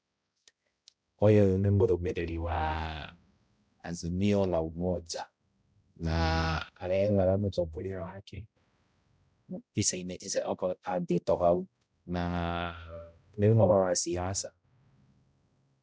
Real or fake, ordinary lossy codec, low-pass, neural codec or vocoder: fake; none; none; codec, 16 kHz, 0.5 kbps, X-Codec, HuBERT features, trained on balanced general audio